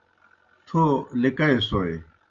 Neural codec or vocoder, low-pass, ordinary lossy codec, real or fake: none; 7.2 kHz; Opus, 32 kbps; real